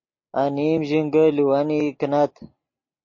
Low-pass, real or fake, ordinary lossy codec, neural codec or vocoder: 7.2 kHz; real; MP3, 32 kbps; none